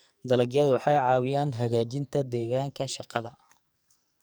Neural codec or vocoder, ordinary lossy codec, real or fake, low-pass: codec, 44.1 kHz, 2.6 kbps, SNAC; none; fake; none